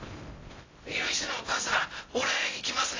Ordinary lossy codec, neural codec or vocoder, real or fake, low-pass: AAC, 32 kbps; codec, 16 kHz in and 24 kHz out, 0.6 kbps, FocalCodec, streaming, 4096 codes; fake; 7.2 kHz